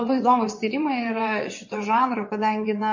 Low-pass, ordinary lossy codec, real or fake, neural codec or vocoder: 7.2 kHz; MP3, 32 kbps; fake; vocoder, 22.05 kHz, 80 mel bands, WaveNeXt